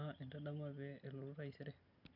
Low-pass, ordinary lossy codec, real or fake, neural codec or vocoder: 5.4 kHz; none; real; none